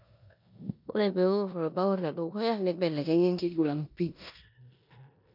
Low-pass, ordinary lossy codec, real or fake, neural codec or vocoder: 5.4 kHz; none; fake; codec, 16 kHz in and 24 kHz out, 0.9 kbps, LongCat-Audio-Codec, four codebook decoder